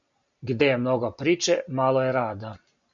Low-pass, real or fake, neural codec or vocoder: 7.2 kHz; real; none